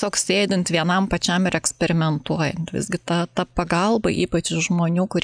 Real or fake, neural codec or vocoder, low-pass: real; none; 9.9 kHz